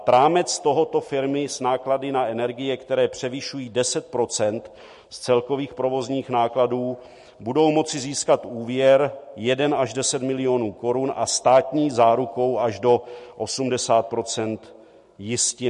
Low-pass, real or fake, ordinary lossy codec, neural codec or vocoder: 14.4 kHz; real; MP3, 48 kbps; none